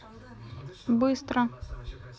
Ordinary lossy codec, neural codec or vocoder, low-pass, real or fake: none; none; none; real